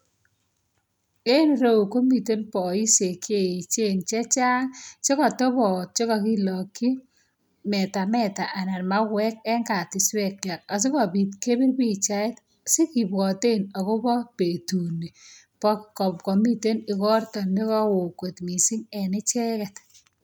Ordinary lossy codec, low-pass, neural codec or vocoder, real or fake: none; none; none; real